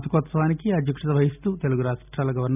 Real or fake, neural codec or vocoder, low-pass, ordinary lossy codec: real; none; 3.6 kHz; none